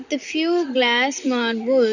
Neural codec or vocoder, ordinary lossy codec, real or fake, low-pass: vocoder, 44.1 kHz, 128 mel bands, Pupu-Vocoder; none; fake; 7.2 kHz